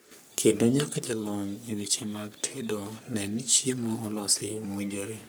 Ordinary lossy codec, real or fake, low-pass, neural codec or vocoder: none; fake; none; codec, 44.1 kHz, 3.4 kbps, Pupu-Codec